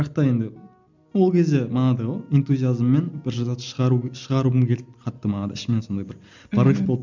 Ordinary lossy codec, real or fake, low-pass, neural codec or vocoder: none; real; 7.2 kHz; none